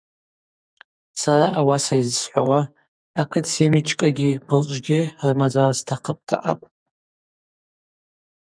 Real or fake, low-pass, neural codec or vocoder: fake; 9.9 kHz; codec, 44.1 kHz, 2.6 kbps, SNAC